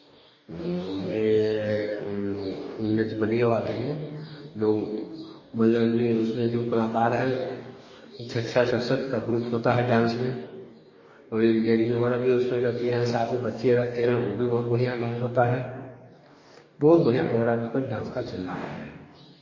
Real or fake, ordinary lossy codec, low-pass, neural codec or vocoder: fake; MP3, 32 kbps; 7.2 kHz; codec, 44.1 kHz, 2.6 kbps, DAC